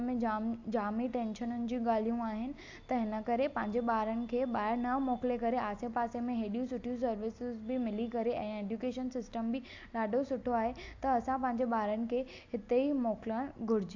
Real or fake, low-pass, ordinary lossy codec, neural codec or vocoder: real; 7.2 kHz; AAC, 48 kbps; none